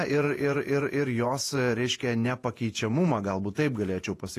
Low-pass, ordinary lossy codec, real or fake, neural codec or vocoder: 14.4 kHz; AAC, 48 kbps; fake; vocoder, 48 kHz, 128 mel bands, Vocos